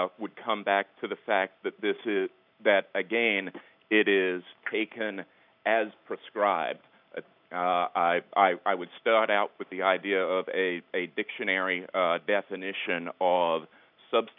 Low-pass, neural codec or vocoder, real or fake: 5.4 kHz; none; real